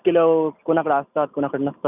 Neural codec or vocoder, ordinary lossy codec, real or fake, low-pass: none; none; real; 3.6 kHz